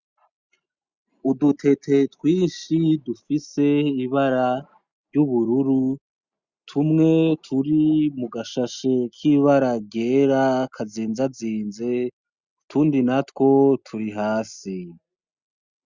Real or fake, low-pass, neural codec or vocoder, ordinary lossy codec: real; 7.2 kHz; none; Opus, 64 kbps